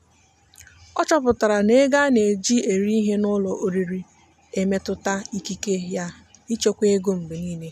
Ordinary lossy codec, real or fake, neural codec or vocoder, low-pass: none; real; none; 14.4 kHz